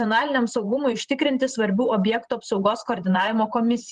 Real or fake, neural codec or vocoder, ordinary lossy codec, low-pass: fake; vocoder, 44.1 kHz, 128 mel bands every 512 samples, BigVGAN v2; Opus, 24 kbps; 10.8 kHz